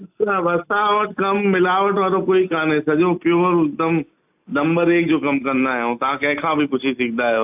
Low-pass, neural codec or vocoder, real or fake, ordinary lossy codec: 3.6 kHz; none; real; AAC, 32 kbps